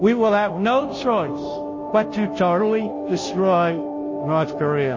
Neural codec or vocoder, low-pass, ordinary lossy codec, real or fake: codec, 16 kHz, 0.5 kbps, FunCodec, trained on Chinese and English, 25 frames a second; 7.2 kHz; MP3, 32 kbps; fake